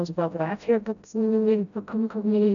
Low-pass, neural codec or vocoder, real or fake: 7.2 kHz; codec, 16 kHz, 0.5 kbps, FreqCodec, smaller model; fake